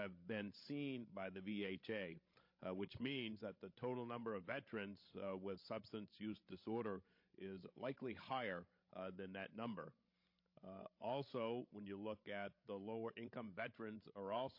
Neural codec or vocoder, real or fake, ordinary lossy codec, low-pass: codec, 16 kHz, 16 kbps, FreqCodec, larger model; fake; MP3, 32 kbps; 5.4 kHz